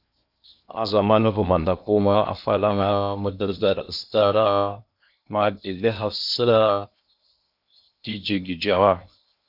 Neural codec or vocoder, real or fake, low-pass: codec, 16 kHz in and 24 kHz out, 0.8 kbps, FocalCodec, streaming, 65536 codes; fake; 5.4 kHz